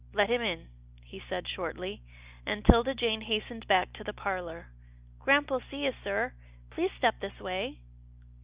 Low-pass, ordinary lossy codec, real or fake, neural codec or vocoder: 3.6 kHz; Opus, 64 kbps; real; none